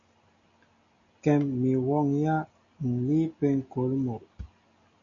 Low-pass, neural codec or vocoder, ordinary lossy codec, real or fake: 7.2 kHz; none; MP3, 48 kbps; real